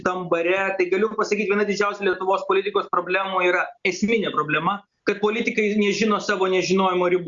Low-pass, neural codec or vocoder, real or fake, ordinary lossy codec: 7.2 kHz; none; real; Opus, 64 kbps